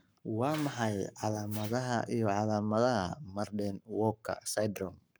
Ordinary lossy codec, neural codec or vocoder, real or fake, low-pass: none; codec, 44.1 kHz, 7.8 kbps, Pupu-Codec; fake; none